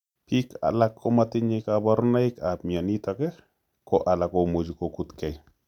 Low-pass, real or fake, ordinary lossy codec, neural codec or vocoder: 19.8 kHz; real; none; none